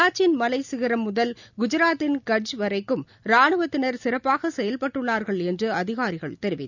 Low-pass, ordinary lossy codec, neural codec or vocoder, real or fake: 7.2 kHz; none; none; real